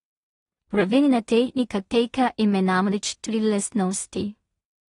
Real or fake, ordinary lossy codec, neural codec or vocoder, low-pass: fake; AAC, 32 kbps; codec, 16 kHz in and 24 kHz out, 0.4 kbps, LongCat-Audio-Codec, two codebook decoder; 10.8 kHz